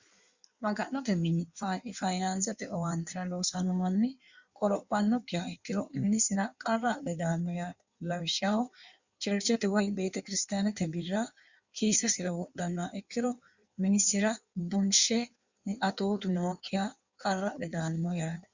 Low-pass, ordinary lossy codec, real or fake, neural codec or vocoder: 7.2 kHz; Opus, 64 kbps; fake; codec, 16 kHz in and 24 kHz out, 1.1 kbps, FireRedTTS-2 codec